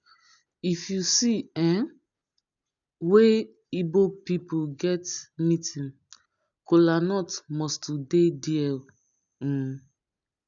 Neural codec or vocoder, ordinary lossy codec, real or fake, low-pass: none; none; real; 7.2 kHz